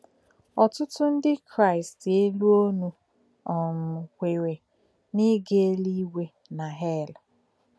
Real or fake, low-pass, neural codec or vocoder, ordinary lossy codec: real; none; none; none